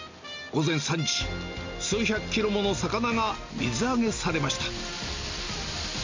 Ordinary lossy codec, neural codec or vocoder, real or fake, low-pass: MP3, 64 kbps; none; real; 7.2 kHz